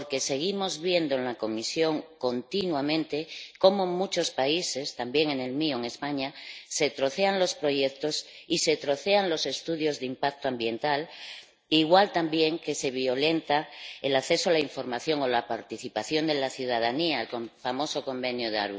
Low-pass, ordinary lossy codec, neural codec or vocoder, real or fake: none; none; none; real